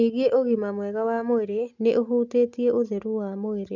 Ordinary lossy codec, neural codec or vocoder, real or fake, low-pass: none; none; real; 7.2 kHz